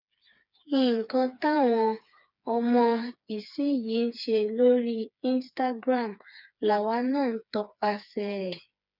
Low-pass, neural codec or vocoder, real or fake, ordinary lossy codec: 5.4 kHz; codec, 16 kHz, 4 kbps, FreqCodec, smaller model; fake; none